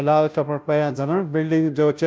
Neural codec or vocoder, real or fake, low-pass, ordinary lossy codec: codec, 16 kHz, 0.5 kbps, FunCodec, trained on Chinese and English, 25 frames a second; fake; none; none